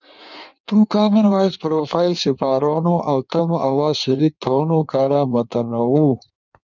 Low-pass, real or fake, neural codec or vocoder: 7.2 kHz; fake; codec, 16 kHz in and 24 kHz out, 1.1 kbps, FireRedTTS-2 codec